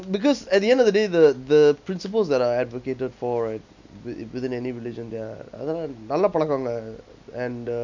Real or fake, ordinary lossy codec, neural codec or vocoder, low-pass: real; none; none; 7.2 kHz